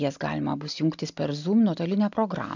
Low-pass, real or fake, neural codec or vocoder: 7.2 kHz; real; none